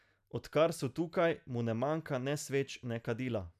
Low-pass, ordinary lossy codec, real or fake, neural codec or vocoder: 14.4 kHz; none; real; none